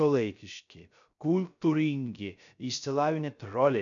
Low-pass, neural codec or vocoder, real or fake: 7.2 kHz; codec, 16 kHz, 0.3 kbps, FocalCodec; fake